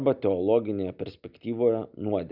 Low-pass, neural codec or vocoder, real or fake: 5.4 kHz; none; real